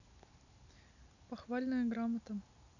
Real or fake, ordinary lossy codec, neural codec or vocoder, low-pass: real; none; none; 7.2 kHz